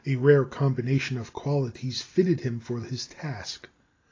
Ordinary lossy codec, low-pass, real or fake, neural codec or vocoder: AAC, 32 kbps; 7.2 kHz; real; none